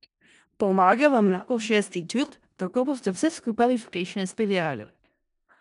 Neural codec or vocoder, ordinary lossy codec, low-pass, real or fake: codec, 16 kHz in and 24 kHz out, 0.4 kbps, LongCat-Audio-Codec, four codebook decoder; none; 10.8 kHz; fake